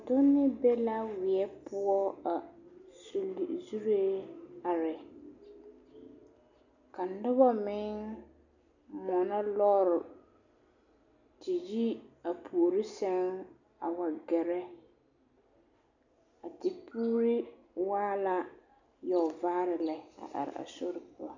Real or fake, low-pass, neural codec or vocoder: real; 7.2 kHz; none